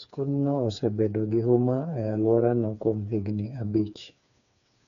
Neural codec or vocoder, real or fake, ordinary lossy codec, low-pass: codec, 16 kHz, 4 kbps, FreqCodec, smaller model; fake; none; 7.2 kHz